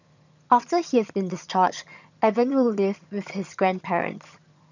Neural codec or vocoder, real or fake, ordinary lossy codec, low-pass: vocoder, 22.05 kHz, 80 mel bands, HiFi-GAN; fake; none; 7.2 kHz